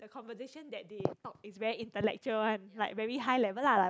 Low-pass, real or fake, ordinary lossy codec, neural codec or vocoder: none; real; none; none